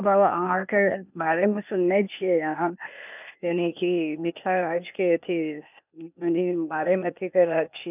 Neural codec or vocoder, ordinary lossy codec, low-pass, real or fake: codec, 16 kHz, 0.8 kbps, ZipCodec; none; 3.6 kHz; fake